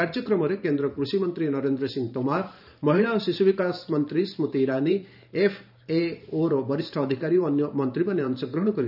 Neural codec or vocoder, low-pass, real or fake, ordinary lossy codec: vocoder, 44.1 kHz, 128 mel bands every 512 samples, BigVGAN v2; 5.4 kHz; fake; none